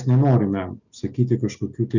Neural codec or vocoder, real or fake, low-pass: none; real; 7.2 kHz